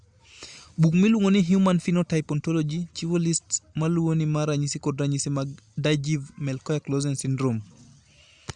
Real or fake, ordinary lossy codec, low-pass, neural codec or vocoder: real; Opus, 64 kbps; 10.8 kHz; none